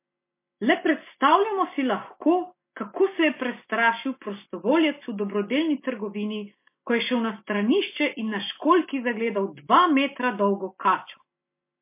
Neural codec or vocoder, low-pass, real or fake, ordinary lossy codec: none; 3.6 kHz; real; MP3, 24 kbps